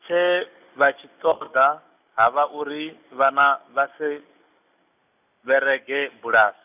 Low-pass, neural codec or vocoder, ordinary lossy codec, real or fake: 3.6 kHz; none; none; real